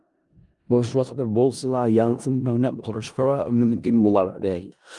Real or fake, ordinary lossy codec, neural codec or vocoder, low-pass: fake; Opus, 32 kbps; codec, 16 kHz in and 24 kHz out, 0.4 kbps, LongCat-Audio-Codec, four codebook decoder; 10.8 kHz